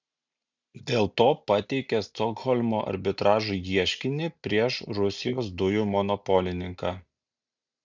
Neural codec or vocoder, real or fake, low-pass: none; real; 7.2 kHz